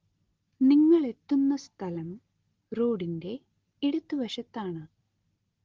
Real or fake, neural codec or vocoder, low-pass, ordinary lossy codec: real; none; 7.2 kHz; Opus, 16 kbps